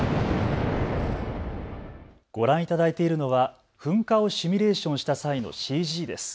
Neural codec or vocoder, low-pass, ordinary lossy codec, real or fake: none; none; none; real